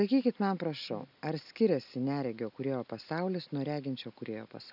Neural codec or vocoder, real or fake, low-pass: none; real; 5.4 kHz